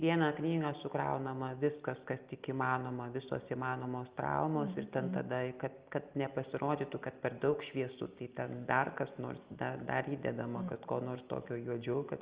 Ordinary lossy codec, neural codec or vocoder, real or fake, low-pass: Opus, 24 kbps; none; real; 3.6 kHz